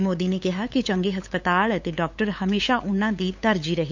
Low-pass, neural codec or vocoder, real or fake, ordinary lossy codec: 7.2 kHz; codec, 16 kHz, 8 kbps, FunCodec, trained on LibriTTS, 25 frames a second; fake; MP3, 48 kbps